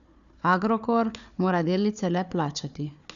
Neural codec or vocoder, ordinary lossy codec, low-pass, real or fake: codec, 16 kHz, 4 kbps, FunCodec, trained on Chinese and English, 50 frames a second; none; 7.2 kHz; fake